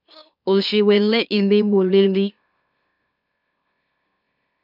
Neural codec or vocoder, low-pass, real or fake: autoencoder, 44.1 kHz, a latent of 192 numbers a frame, MeloTTS; 5.4 kHz; fake